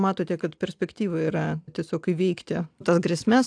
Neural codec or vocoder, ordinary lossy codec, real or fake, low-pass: none; AAC, 64 kbps; real; 9.9 kHz